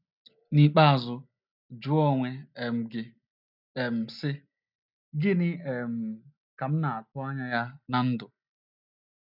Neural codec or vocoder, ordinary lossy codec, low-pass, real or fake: none; none; 5.4 kHz; real